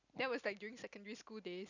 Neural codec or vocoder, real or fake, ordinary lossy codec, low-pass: none; real; none; 7.2 kHz